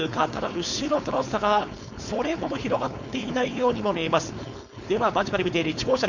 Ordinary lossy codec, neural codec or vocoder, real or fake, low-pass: none; codec, 16 kHz, 4.8 kbps, FACodec; fake; 7.2 kHz